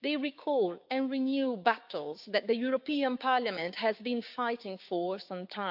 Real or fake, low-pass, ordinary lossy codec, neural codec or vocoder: fake; 5.4 kHz; AAC, 48 kbps; codec, 16 kHz, 6 kbps, DAC